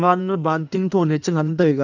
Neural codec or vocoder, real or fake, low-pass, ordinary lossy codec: codec, 16 kHz in and 24 kHz out, 1.1 kbps, FireRedTTS-2 codec; fake; 7.2 kHz; none